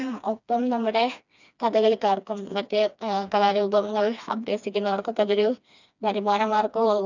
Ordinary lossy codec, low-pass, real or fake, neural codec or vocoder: none; 7.2 kHz; fake; codec, 16 kHz, 2 kbps, FreqCodec, smaller model